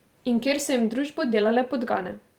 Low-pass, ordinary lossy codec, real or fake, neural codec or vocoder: 19.8 kHz; Opus, 24 kbps; fake; vocoder, 44.1 kHz, 128 mel bands every 512 samples, BigVGAN v2